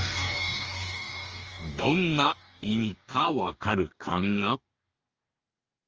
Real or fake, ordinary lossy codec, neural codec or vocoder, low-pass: fake; Opus, 24 kbps; codec, 44.1 kHz, 2.6 kbps, DAC; 7.2 kHz